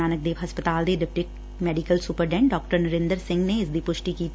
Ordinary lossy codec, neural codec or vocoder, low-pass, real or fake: none; none; none; real